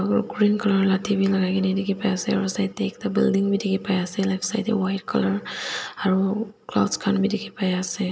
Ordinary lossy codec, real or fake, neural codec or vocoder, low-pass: none; real; none; none